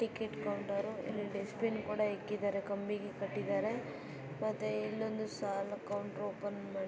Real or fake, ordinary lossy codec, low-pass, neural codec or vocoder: real; none; none; none